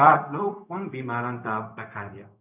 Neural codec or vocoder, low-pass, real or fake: codec, 16 kHz, 0.4 kbps, LongCat-Audio-Codec; 3.6 kHz; fake